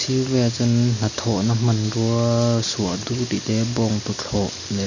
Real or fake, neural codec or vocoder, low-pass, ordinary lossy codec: real; none; 7.2 kHz; none